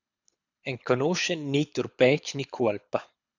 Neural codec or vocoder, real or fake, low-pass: codec, 24 kHz, 6 kbps, HILCodec; fake; 7.2 kHz